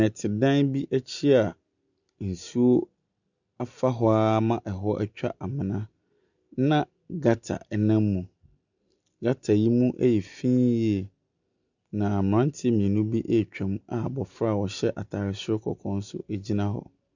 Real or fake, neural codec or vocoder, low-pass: real; none; 7.2 kHz